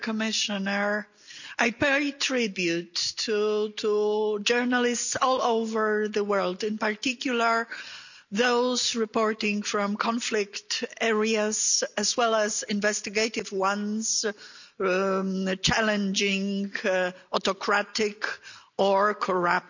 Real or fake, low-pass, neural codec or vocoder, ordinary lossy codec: real; 7.2 kHz; none; none